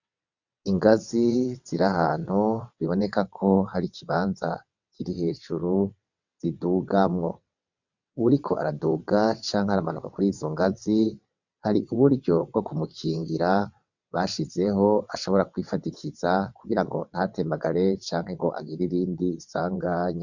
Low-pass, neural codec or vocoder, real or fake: 7.2 kHz; vocoder, 22.05 kHz, 80 mel bands, WaveNeXt; fake